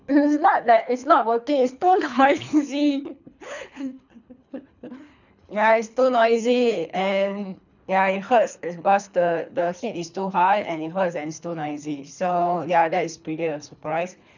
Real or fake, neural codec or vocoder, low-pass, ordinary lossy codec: fake; codec, 24 kHz, 3 kbps, HILCodec; 7.2 kHz; none